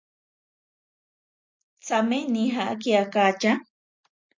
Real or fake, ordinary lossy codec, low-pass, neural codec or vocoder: real; MP3, 64 kbps; 7.2 kHz; none